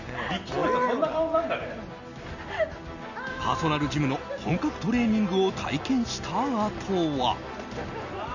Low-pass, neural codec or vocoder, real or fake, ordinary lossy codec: 7.2 kHz; none; real; none